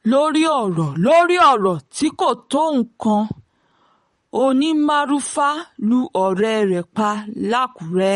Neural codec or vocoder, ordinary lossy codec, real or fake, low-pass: vocoder, 44.1 kHz, 128 mel bands, Pupu-Vocoder; MP3, 48 kbps; fake; 19.8 kHz